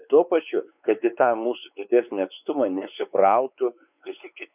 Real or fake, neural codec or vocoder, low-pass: fake; codec, 16 kHz, 4 kbps, X-Codec, WavLM features, trained on Multilingual LibriSpeech; 3.6 kHz